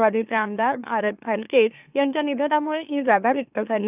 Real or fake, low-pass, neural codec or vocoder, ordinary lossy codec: fake; 3.6 kHz; autoencoder, 44.1 kHz, a latent of 192 numbers a frame, MeloTTS; none